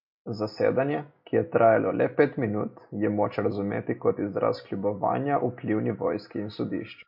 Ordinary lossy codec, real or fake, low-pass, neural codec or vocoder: MP3, 32 kbps; real; 5.4 kHz; none